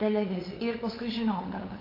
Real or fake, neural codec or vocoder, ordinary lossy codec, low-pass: fake; codec, 16 kHz, 8 kbps, FunCodec, trained on LibriTTS, 25 frames a second; AAC, 24 kbps; 5.4 kHz